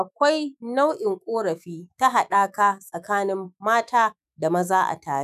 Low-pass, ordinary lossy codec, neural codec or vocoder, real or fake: 14.4 kHz; none; autoencoder, 48 kHz, 128 numbers a frame, DAC-VAE, trained on Japanese speech; fake